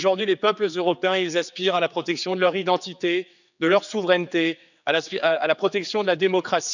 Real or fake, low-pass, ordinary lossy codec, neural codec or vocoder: fake; 7.2 kHz; none; codec, 16 kHz, 4 kbps, X-Codec, HuBERT features, trained on general audio